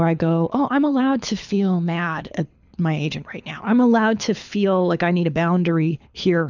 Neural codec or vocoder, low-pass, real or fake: codec, 24 kHz, 6 kbps, HILCodec; 7.2 kHz; fake